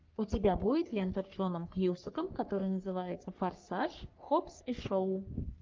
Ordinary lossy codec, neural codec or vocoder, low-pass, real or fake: Opus, 24 kbps; codec, 44.1 kHz, 3.4 kbps, Pupu-Codec; 7.2 kHz; fake